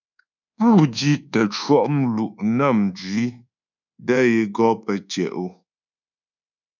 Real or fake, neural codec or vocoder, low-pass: fake; codec, 24 kHz, 1.2 kbps, DualCodec; 7.2 kHz